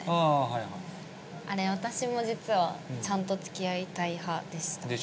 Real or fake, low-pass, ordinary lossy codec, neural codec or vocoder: real; none; none; none